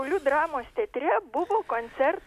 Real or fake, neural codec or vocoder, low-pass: real; none; 14.4 kHz